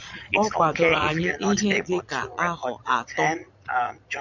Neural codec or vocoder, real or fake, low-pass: vocoder, 22.05 kHz, 80 mel bands, Vocos; fake; 7.2 kHz